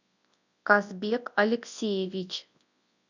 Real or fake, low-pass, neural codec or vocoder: fake; 7.2 kHz; codec, 24 kHz, 0.9 kbps, WavTokenizer, large speech release